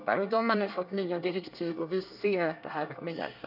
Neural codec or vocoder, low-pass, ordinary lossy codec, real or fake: codec, 24 kHz, 1 kbps, SNAC; 5.4 kHz; none; fake